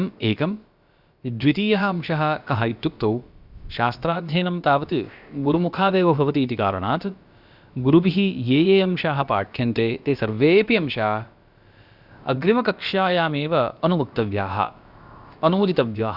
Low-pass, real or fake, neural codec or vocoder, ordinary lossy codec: 5.4 kHz; fake; codec, 16 kHz, about 1 kbps, DyCAST, with the encoder's durations; Opus, 64 kbps